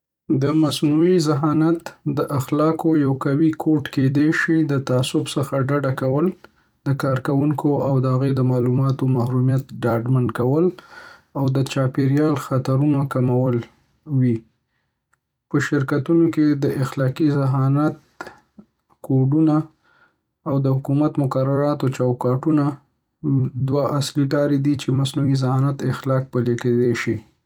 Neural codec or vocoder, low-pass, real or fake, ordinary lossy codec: vocoder, 44.1 kHz, 128 mel bands every 256 samples, BigVGAN v2; 19.8 kHz; fake; none